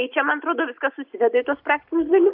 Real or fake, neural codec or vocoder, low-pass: real; none; 5.4 kHz